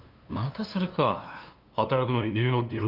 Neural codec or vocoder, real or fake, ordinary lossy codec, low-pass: codec, 16 kHz, 2 kbps, FunCodec, trained on LibriTTS, 25 frames a second; fake; Opus, 32 kbps; 5.4 kHz